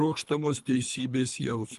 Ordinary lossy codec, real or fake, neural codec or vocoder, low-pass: Opus, 32 kbps; fake; codec, 24 kHz, 3 kbps, HILCodec; 10.8 kHz